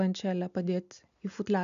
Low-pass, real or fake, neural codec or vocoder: 7.2 kHz; real; none